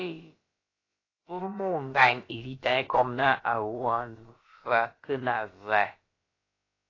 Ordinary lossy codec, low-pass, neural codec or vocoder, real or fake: AAC, 32 kbps; 7.2 kHz; codec, 16 kHz, about 1 kbps, DyCAST, with the encoder's durations; fake